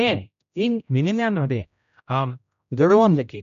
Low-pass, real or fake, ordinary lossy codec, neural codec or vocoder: 7.2 kHz; fake; none; codec, 16 kHz, 0.5 kbps, X-Codec, HuBERT features, trained on general audio